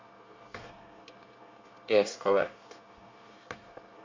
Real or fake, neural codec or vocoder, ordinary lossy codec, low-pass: fake; codec, 24 kHz, 1 kbps, SNAC; MP3, 48 kbps; 7.2 kHz